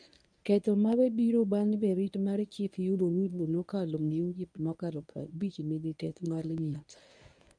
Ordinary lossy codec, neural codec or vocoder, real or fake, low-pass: none; codec, 24 kHz, 0.9 kbps, WavTokenizer, medium speech release version 1; fake; 9.9 kHz